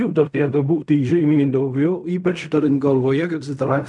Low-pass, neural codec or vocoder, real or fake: 10.8 kHz; codec, 16 kHz in and 24 kHz out, 0.4 kbps, LongCat-Audio-Codec, fine tuned four codebook decoder; fake